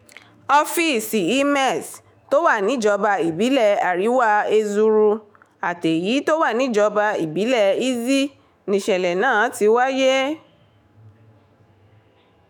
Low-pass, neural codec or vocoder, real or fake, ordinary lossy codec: 19.8 kHz; autoencoder, 48 kHz, 128 numbers a frame, DAC-VAE, trained on Japanese speech; fake; MP3, 96 kbps